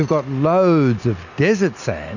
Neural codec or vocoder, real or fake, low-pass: none; real; 7.2 kHz